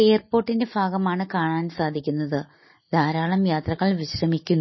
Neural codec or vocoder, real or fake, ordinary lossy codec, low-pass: none; real; MP3, 24 kbps; 7.2 kHz